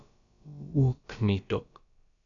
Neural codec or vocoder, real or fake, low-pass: codec, 16 kHz, about 1 kbps, DyCAST, with the encoder's durations; fake; 7.2 kHz